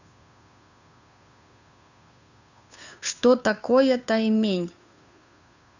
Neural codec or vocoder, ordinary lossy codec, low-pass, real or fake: codec, 16 kHz, 2 kbps, FunCodec, trained on Chinese and English, 25 frames a second; none; 7.2 kHz; fake